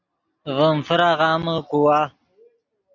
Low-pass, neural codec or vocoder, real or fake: 7.2 kHz; none; real